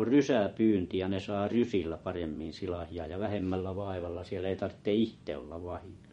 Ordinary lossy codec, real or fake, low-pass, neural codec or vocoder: MP3, 48 kbps; fake; 10.8 kHz; vocoder, 24 kHz, 100 mel bands, Vocos